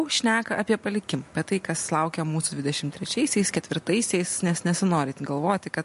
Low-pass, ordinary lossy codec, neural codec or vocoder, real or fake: 14.4 kHz; MP3, 48 kbps; none; real